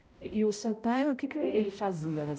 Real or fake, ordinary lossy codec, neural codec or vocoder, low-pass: fake; none; codec, 16 kHz, 0.5 kbps, X-Codec, HuBERT features, trained on balanced general audio; none